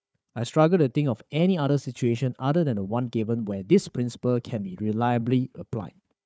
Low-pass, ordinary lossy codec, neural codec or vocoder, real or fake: none; none; codec, 16 kHz, 4 kbps, FunCodec, trained on Chinese and English, 50 frames a second; fake